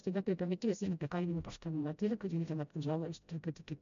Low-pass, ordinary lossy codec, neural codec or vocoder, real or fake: 7.2 kHz; MP3, 64 kbps; codec, 16 kHz, 0.5 kbps, FreqCodec, smaller model; fake